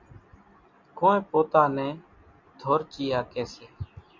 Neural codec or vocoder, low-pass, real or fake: none; 7.2 kHz; real